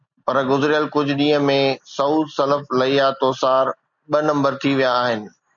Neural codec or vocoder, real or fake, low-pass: none; real; 7.2 kHz